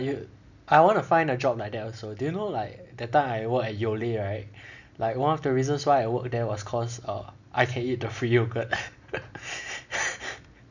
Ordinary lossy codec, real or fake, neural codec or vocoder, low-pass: none; real; none; 7.2 kHz